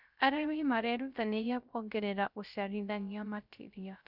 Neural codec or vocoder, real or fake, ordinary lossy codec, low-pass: codec, 16 kHz, 0.3 kbps, FocalCodec; fake; none; 5.4 kHz